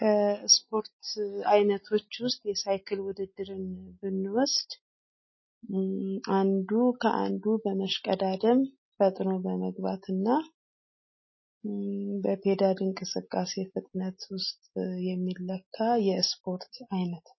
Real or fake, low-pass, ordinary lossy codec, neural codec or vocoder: real; 7.2 kHz; MP3, 24 kbps; none